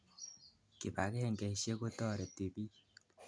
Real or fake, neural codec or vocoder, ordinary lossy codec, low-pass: real; none; none; 9.9 kHz